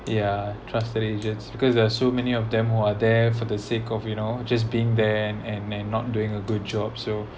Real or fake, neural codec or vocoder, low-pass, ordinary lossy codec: real; none; none; none